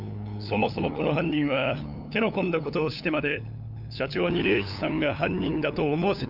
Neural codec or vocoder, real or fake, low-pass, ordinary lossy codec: codec, 16 kHz, 8 kbps, FunCodec, trained on LibriTTS, 25 frames a second; fake; 5.4 kHz; none